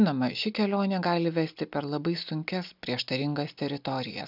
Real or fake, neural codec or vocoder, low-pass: real; none; 5.4 kHz